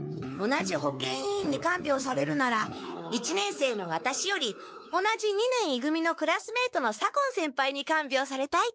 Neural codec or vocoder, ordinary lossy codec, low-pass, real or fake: codec, 16 kHz, 4 kbps, X-Codec, WavLM features, trained on Multilingual LibriSpeech; none; none; fake